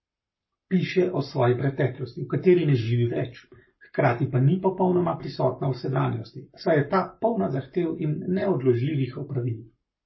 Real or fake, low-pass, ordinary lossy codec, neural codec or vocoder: fake; 7.2 kHz; MP3, 24 kbps; codec, 44.1 kHz, 7.8 kbps, Pupu-Codec